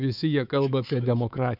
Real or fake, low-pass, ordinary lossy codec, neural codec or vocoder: fake; 5.4 kHz; AAC, 48 kbps; codec, 24 kHz, 3.1 kbps, DualCodec